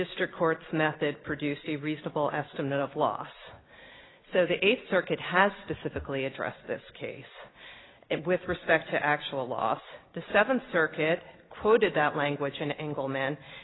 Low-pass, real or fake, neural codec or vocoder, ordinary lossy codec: 7.2 kHz; real; none; AAC, 16 kbps